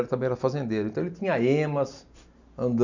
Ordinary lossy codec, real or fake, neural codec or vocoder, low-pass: none; real; none; 7.2 kHz